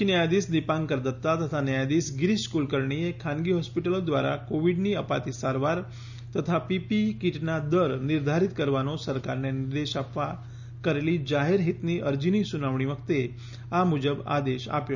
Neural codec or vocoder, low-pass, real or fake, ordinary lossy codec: none; 7.2 kHz; real; none